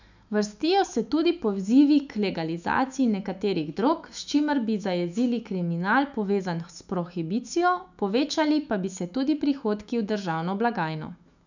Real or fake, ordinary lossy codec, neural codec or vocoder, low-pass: fake; none; autoencoder, 48 kHz, 128 numbers a frame, DAC-VAE, trained on Japanese speech; 7.2 kHz